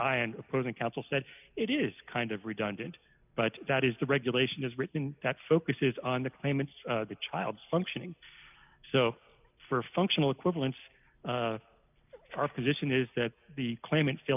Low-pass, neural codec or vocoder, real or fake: 3.6 kHz; none; real